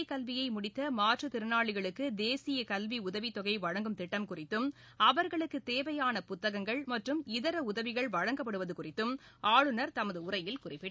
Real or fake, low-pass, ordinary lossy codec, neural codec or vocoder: real; none; none; none